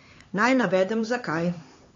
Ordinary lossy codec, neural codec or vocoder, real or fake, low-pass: AAC, 32 kbps; codec, 16 kHz, 4 kbps, X-Codec, HuBERT features, trained on LibriSpeech; fake; 7.2 kHz